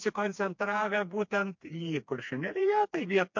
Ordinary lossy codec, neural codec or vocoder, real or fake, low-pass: MP3, 48 kbps; codec, 16 kHz, 2 kbps, FreqCodec, smaller model; fake; 7.2 kHz